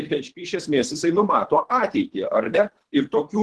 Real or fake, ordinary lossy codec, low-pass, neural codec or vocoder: fake; Opus, 16 kbps; 10.8 kHz; codec, 24 kHz, 3 kbps, HILCodec